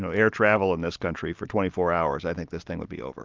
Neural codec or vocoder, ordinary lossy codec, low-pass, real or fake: none; Opus, 32 kbps; 7.2 kHz; real